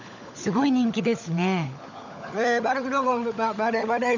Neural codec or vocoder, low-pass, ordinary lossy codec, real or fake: codec, 16 kHz, 16 kbps, FunCodec, trained on LibriTTS, 50 frames a second; 7.2 kHz; none; fake